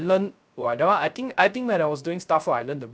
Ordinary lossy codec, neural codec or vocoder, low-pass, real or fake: none; codec, 16 kHz, 0.3 kbps, FocalCodec; none; fake